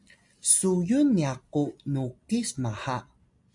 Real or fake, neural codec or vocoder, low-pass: real; none; 10.8 kHz